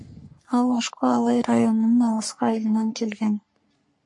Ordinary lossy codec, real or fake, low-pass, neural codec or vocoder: MP3, 48 kbps; fake; 10.8 kHz; codec, 44.1 kHz, 3.4 kbps, Pupu-Codec